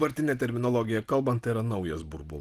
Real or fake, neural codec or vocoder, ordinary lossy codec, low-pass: real; none; Opus, 24 kbps; 14.4 kHz